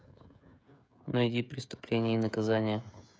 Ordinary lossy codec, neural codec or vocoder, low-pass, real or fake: none; codec, 16 kHz, 16 kbps, FreqCodec, smaller model; none; fake